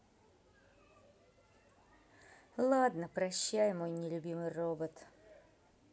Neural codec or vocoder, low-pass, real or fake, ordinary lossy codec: none; none; real; none